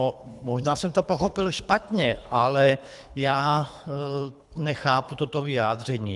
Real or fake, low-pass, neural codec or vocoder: fake; 10.8 kHz; codec, 24 kHz, 3 kbps, HILCodec